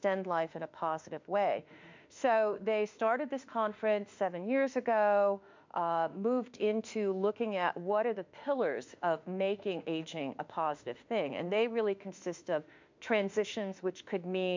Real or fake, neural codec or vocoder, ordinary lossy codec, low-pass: fake; autoencoder, 48 kHz, 32 numbers a frame, DAC-VAE, trained on Japanese speech; MP3, 64 kbps; 7.2 kHz